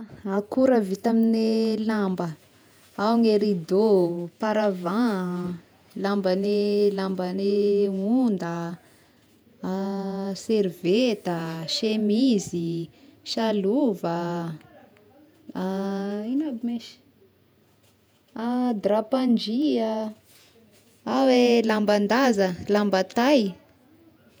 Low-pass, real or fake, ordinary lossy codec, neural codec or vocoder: none; fake; none; vocoder, 48 kHz, 128 mel bands, Vocos